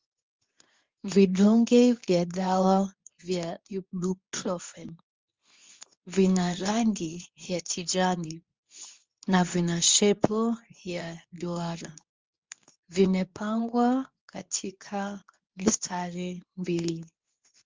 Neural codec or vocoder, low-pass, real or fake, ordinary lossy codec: codec, 24 kHz, 0.9 kbps, WavTokenizer, medium speech release version 2; 7.2 kHz; fake; Opus, 32 kbps